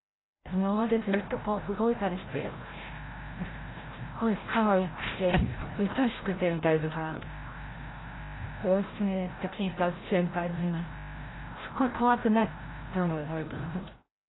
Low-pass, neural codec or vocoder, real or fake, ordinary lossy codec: 7.2 kHz; codec, 16 kHz, 0.5 kbps, FreqCodec, larger model; fake; AAC, 16 kbps